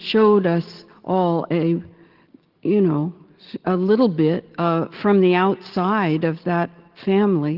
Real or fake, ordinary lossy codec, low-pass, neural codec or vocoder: real; Opus, 16 kbps; 5.4 kHz; none